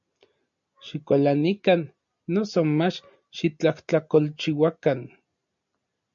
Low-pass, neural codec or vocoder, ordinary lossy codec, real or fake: 7.2 kHz; none; MP3, 48 kbps; real